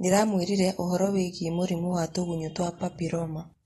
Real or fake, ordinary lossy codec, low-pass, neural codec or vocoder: real; AAC, 32 kbps; 19.8 kHz; none